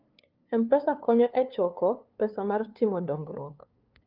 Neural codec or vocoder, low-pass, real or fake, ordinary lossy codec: codec, 16 kHz, 2 kbps, FunCodec, trained on LibriTTS, 25 frames a second; 5.4 kHz; fake; Opus, 24 kbps